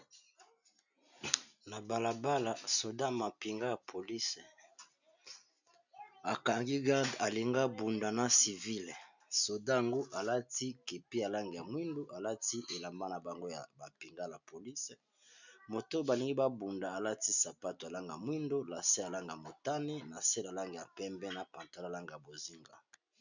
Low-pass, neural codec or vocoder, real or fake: 7.2 kHz; none; real